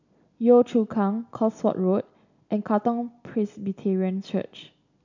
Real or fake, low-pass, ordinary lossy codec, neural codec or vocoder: real; 7.2 kHz; none; none